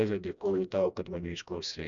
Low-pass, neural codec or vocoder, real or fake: 7.2 kHz; codec, 16 kHz, 1 kbps, FreqCodec, smaller model; fake